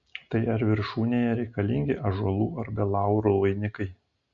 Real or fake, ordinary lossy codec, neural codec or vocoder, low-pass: real; MP3, 48 kbps; none; 7.2 kHz